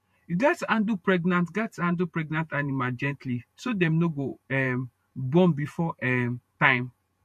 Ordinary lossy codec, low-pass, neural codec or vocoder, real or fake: MP3, 64 kbps; 14.4 kHz; vocoder, 48 kHz, 128 mel bands, Vocos; fake